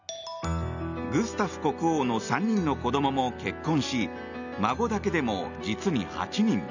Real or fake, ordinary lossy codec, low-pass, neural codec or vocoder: real; none; 7.2 kHz; none